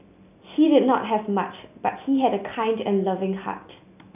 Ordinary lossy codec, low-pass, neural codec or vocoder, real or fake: none; 3.6 kHz; none; real